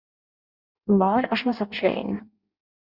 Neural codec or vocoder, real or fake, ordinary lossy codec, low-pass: codec, 16 kHz in and 24 kHz out, 0.6 kbps, FireRedTTS-2 codec; fake; Opus, 64 kbps; 5.4 kHz